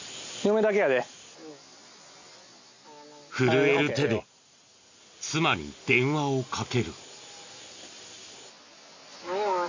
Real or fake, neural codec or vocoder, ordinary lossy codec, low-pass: real; none; AAC, 48 kbps; 7.2 kHz